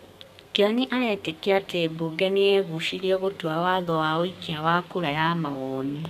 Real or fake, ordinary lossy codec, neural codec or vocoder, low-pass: fake; none; codec, 32 kHz, 1.9 kbps, SNAC; 14.4 kHz